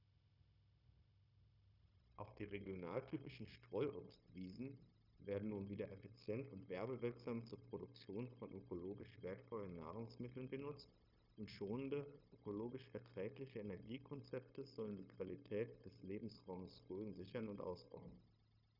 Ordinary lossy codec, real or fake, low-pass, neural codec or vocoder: none; fake; 5.4 kHz; codec, 16 kHz, 0.9 kbps, LongCat-Audio-Codec